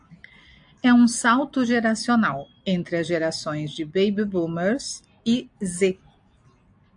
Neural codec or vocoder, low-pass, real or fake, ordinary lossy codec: none; 9.9 kHz; real; AAC, 64 kbps